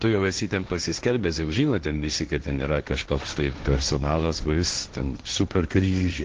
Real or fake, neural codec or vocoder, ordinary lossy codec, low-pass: fake; codec, 16 kHz, 1.1 kbps, Voila-Tokenizer; Opus, 16 kbps; 7.2 kHz